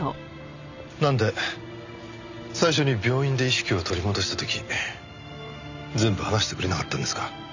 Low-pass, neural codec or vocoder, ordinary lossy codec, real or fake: 7.2 kHz; none; none; real